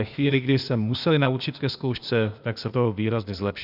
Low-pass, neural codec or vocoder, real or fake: 5.4 kHz; codec, 16 kHz, 0.8 kbps, ZipCodec; fake